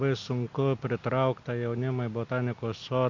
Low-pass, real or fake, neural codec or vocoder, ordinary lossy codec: 7.2 kHz; real; none; MP3, 64 kbps